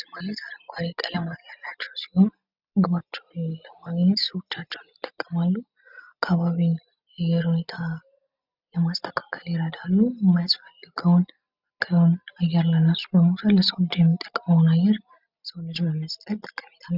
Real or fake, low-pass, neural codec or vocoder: real; 5.4 kHz; none